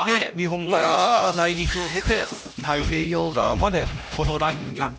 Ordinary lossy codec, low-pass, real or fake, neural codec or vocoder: none; none; fake; codec, 16 kHz, 1 kbps, X-Codec, HuBERT features, trained on LibriSpeech